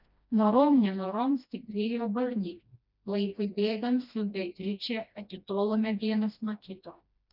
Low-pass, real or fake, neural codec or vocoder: 5.4 kHz; fake; codec, 16 kHz, 1 kbps, FreqCodec, smaller model